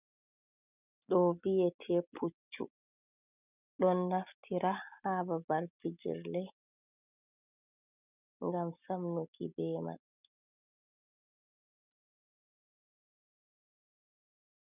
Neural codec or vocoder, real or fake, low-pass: none; real; 3.6 kHz